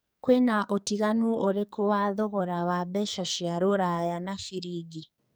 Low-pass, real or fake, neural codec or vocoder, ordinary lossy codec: none; fake; codec, 44.1 kHz, 2.6 kbps, SNAC; none